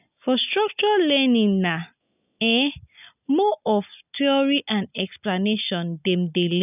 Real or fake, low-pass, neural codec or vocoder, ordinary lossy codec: real; 3.6 kHz; none; none